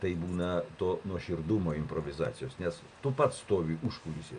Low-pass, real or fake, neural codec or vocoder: 9.9 kHz; real; none